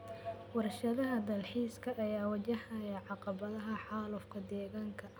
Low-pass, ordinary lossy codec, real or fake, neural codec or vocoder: none; none; real; none